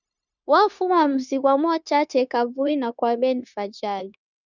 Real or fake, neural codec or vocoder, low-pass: fake; codec, 16 kHz, 0.9 kbps, LongCat-Audio-Codec; 7.2 kHz